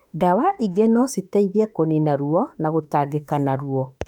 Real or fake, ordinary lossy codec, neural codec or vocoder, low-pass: fake; none; autoencoder, 48 kHz, 32 numbers a frame, DAC-VAE, trained on Japanese speech; 19.8 kHz